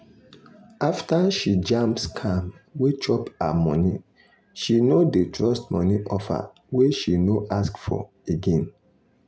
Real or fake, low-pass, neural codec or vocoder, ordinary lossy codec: real; none; none; none